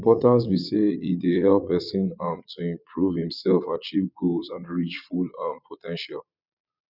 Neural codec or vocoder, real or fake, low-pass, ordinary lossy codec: vocoder, 22.05 kHz, 80 mel bands, Vocos; fake; 5.4 kHz; none